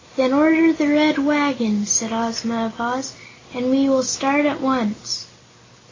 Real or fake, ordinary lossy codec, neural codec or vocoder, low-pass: real; AAC, 32 kbps; none; 7.2 kHz